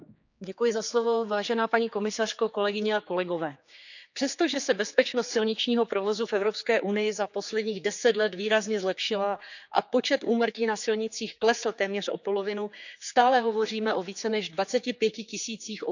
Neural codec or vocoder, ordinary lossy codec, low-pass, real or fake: codec, 16 kHz, 4 kbps, X-Codec, HuBERT features, trained on general audio; none; 7.2 kHz; fake